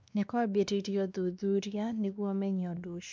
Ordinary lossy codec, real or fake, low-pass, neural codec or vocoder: none; fake; none; codec, 16 kHz, 2 kbps, X-Codec, WavLM features, trained on Multilingual LibriSpeech